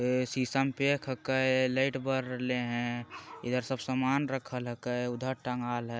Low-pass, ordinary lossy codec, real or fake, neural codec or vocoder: none; none; real; none